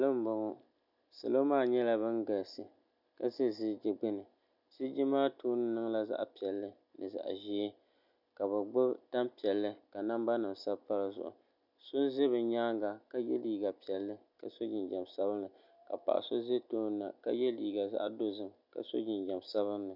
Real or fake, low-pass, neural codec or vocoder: real; 5.4 kHz; none